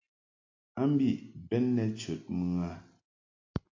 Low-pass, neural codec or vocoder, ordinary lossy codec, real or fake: 7.2 kHz; none; AAC, 32 kbps; real